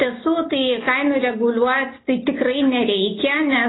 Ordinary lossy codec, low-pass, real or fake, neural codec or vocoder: AAC, 16 kbps; 7.2 kHz; real; none